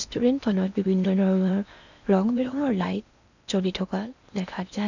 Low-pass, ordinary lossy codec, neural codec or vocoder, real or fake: 7.2 kHz; none; codec, 16 kHz in and 24 kHz out, 0.8 kbps, FocalCodec, streaming, 65536 codes; fake